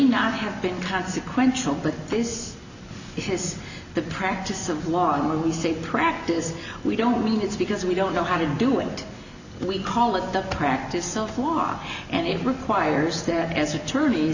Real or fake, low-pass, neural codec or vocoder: real; 7.2 kHz; none